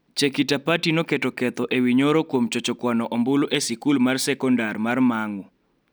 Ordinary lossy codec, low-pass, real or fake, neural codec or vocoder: none; none; real; none